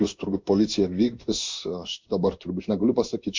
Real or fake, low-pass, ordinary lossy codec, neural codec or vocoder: fake; 7.2 kHz; MP3, 64 kbps; codec, 16 kHz in and 24 kHz out, 1 kbps, XY-Tokenizer